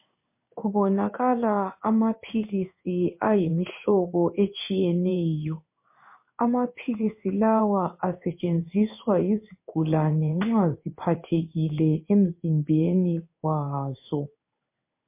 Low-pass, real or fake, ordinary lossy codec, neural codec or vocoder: 3.6 kHz; fake; MP3, 24 kbps; codec, 16 kHz in and 24 kHz out, 1 kbps, XY-Tokenizer